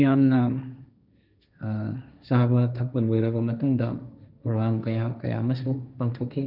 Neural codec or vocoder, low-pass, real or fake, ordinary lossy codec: codec, 16 kHz, 1.1 kbps, Voila-Tokenizer; 5.4 kHz; fake; none